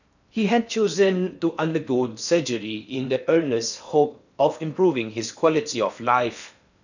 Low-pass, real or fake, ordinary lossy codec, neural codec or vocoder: 7.2 kHz; fake; none; codec, 16 kHz in and 24 kHz out, 0.8 kbps, FocalCodec, streaming, 65536 codes